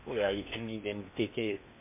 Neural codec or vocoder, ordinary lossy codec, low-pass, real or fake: codec, 16 kHz in and 24 kHz out, 0.8 kbps, FocalCodec, streaming, 65536 codes; MP3, 32 kbps; 3.6 kHz; fake